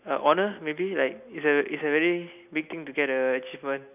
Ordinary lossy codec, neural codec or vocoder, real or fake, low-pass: none; none; real; 3.6 kHz